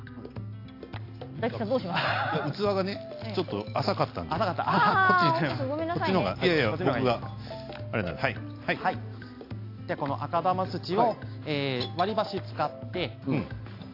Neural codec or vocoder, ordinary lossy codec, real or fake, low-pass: none; AAC, 32 kbps; real; 5.4 kHz